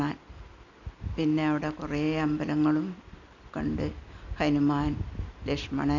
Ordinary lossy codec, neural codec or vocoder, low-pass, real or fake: none; none; 7.2 kHz; real